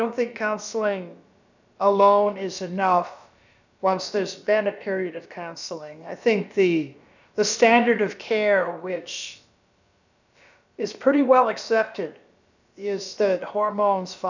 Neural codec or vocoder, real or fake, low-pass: codec, 16 kHz, about 1 kbps, DyCAST, with the encoder's durations; fake; 7.2 kHz